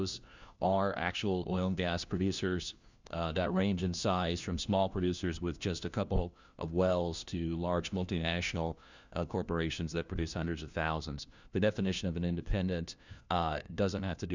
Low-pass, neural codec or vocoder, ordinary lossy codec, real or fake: 7.2 kHz; codec, 16 kHz, 1 kbps, FunCodec, trained on LibriTTS, 50 frames a second; Opus, 64 kbps; fake